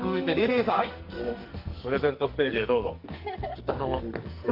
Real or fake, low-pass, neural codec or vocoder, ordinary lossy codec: fake; 5.4 kHz; codec, 44.1 kHz, 2.6 kbps, SNAC; Opus, 32 kbps